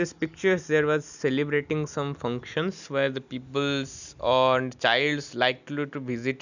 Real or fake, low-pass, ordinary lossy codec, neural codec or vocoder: real; 7.2 kHz; none; none